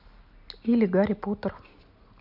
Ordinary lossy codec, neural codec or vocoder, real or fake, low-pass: none; none; real; 5.4 kHz